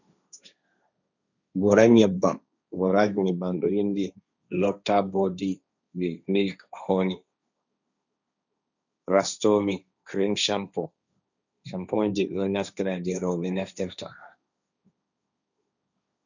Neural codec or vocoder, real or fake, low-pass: codec, 16 kHz, 1.1 kbps, Voila-Tokenizer; fake; 7.2 kHz